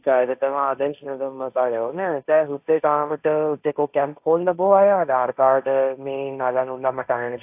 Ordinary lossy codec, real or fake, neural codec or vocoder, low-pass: AAC, 32 kbps; fake; codec, 16 kHz, 1.1 kbps, Voila-Tokenizer; 3.6 kHz